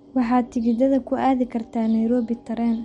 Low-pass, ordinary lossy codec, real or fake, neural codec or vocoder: 19.8 kHz; MP3, 48 kbps; real; none